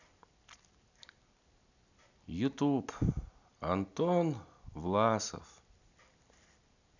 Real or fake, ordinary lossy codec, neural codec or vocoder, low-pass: real; none; none; 7.2 kHz